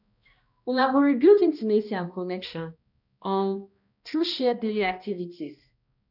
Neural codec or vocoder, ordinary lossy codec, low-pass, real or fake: codec, 16 kHz, 1 kbps, X-Codec, HuBERT features, trained on balanced general audio; none; 5.4 kHz; fake